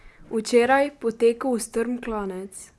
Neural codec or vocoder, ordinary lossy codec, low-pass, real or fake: none; none; none; real